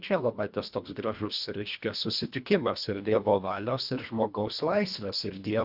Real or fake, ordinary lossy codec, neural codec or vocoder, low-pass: fake; Opus, 64 kbps; codec, 24 kHz, 1.5 kbps, HILCodec; 5.4 kHz